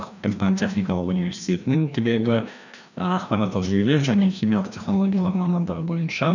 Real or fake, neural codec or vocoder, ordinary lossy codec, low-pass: fake; codec, 16 kHz, 1 kbps, FreqCodec, larger model; none; 7.2 kHz